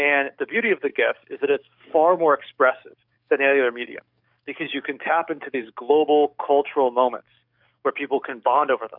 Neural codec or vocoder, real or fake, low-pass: codec, 44.1 kHz, 7.8 kbps, DAC; fake; 5.4 kHz